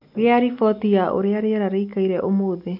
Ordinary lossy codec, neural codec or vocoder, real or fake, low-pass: none; none; real; 5.4 kHz